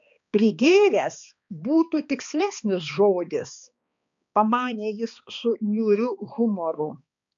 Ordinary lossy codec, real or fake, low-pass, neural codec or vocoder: MP3, 64 kbps; fake; 7.2 kHz; codec, 16 kHz, 2 kbps, X-Codec, HuBERT features, trained on balanced general audio